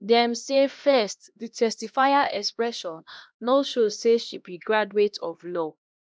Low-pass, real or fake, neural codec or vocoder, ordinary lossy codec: none; fake; codec, 16 kHz, 1 kbps, X-Codec, HuBERT features, trained on LibriSpeech; none